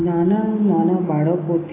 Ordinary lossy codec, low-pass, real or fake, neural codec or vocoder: AAC, 16 kbps; 3.6 kHz; real; none